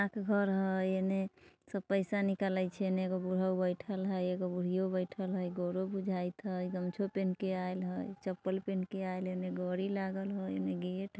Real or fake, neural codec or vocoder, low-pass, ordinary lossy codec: real; none; none; none